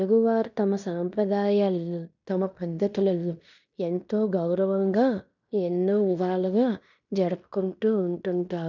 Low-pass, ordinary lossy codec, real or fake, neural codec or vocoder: 7.2 kHz; none; fake; codec, 16 kHz in and 24 kHz out, 0.9 kbps, LongCat-Audio-Codec, fine tuned four codebook decoder